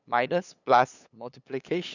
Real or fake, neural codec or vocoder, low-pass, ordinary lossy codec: fake; codec, 16 kHz, 8 kbps, FunCodec, trained on LibriTTS, 25 frames a second; 7.2 kHz; none